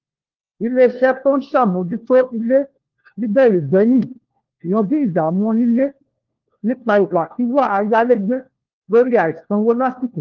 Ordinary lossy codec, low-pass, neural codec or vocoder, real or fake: Opus, 16 kbps; 7.2 kHz; codec, 16 kHz, 1 kbps, FunCodec, trained on LibriTTS, 50 frames a second; fake